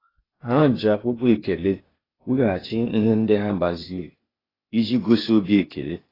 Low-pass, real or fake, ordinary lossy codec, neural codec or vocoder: 5.4 kHz; fake; AAC, 24 kbps; codec, 16 kHz, 0.8 kbps, ZipCodec